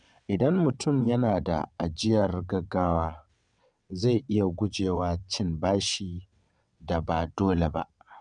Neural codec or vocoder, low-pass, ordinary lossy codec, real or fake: vocoder, 22.05 kHz, 80 mel bands, WaveNeXt; 9.9 kHz; none; fake